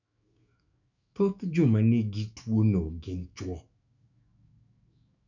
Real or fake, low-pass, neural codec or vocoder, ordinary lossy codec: fake; 7.2 kHz; autoencoder, 48 kHz, 128 numbers a frame, DAC-VAE, trained on Japanese speech; none